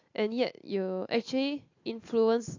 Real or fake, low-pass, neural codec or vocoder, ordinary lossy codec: real; 7.2 kHz; none; AAC, 48 kbps